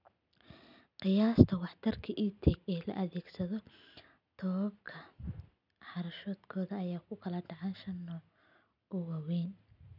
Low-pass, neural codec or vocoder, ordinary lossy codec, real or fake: 5.4 kHz; none; none; real